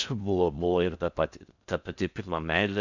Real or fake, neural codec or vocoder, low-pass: fake; codec, 16 kHz in and 24 kHz out, 0.6 kbps, FocalCodec, streaming, 4096 codes; 7.2 kHz